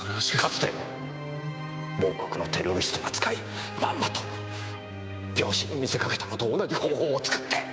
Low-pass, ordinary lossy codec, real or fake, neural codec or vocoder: none; none; fake; codec, 16 kHz, 6 kbps, DAC